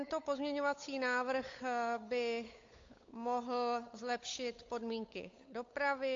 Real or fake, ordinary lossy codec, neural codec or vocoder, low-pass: fake; AAC, 48 kbps; codec, 16 kHz, 8 kbps, FunCodec, trained on Chinese and English, 25 frames a second; 7.2 kHz